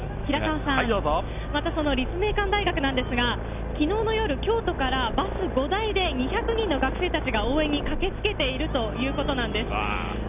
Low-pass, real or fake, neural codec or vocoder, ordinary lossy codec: 3.6 kHz; real; none; none